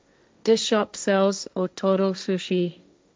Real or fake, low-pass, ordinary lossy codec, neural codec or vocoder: fake; none; none; codec, 16 kHz, 1.1 kbps, Voila-Tokenizer